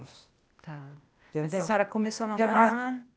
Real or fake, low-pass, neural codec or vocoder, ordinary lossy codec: fake; none; codec, 16 kHz, 0.8 kbps, ZipCodec; none